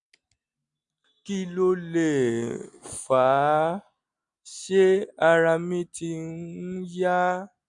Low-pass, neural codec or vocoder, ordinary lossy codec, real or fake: 10.8 kHz; none; none; real